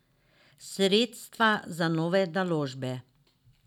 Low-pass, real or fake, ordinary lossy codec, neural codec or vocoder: 19.8 kHz; real; none; none